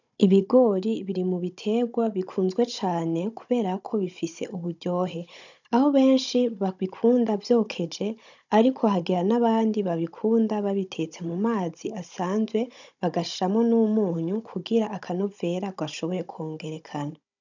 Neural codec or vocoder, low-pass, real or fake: codec, 16 kHz, 16 kbps, FunCodec, trained on Chinese and English, 50 frames a second; 7.2 kHz; fake